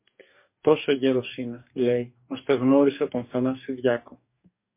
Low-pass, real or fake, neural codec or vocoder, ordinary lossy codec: 3.6 kHz; fake; codec, 44.1 kHz, 2.6 kbps, DAC; MP3, 24 kbps